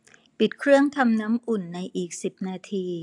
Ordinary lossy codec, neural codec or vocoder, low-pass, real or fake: AAC, 64 kbps; none; 10.8 kHz; real